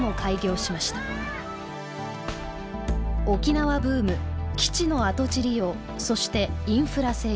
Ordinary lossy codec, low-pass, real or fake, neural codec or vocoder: none; none; real; none